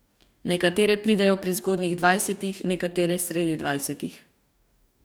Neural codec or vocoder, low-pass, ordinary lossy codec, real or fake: codec, 44.1 kHz, 2.6 kbps, DAC; none; none; fake